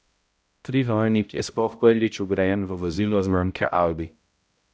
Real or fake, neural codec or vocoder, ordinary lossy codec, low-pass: fake; codec, 16 kHz, 0.5 kbps, X-Codec, HuBERT features, trained on balanced general audio; none; none